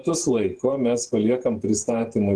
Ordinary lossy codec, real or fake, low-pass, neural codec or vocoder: Opus, 16 kbps; fake; 10.8 kHz; vocoder, 48 kHz, 128 mel bands, Vocos